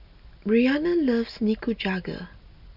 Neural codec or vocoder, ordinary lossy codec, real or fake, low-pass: none; none; real; 5.4 kHz